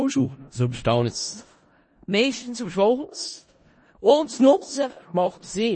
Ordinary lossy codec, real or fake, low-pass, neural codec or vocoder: MP3, 32 kbps; fake; 9.9 kHz; codec, 16 kHz in and 24 kHz out, 0.4 kbps, LongCat-Audio-Codec, four codebook decoder